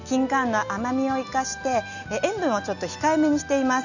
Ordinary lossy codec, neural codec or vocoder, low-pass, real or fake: none; none; 7.2 kHz; real